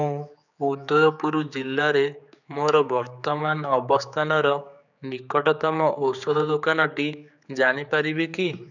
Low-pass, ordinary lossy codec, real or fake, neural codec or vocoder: 7.2 kHz; none; fake; codec, 16 kHz, 4 kbps, X-Codec, HuBERT features, trained on general audio